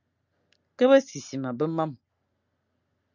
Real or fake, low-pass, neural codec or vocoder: real; 7.2 kHz; none